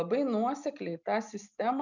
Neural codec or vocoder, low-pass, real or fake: none; 7.2 kHz; real